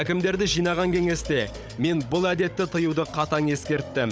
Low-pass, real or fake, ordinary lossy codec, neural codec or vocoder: none; fake; none; codec, 16 kHz, 16 kbps, FunCodec, trained on Chinese and English, 50 frames a second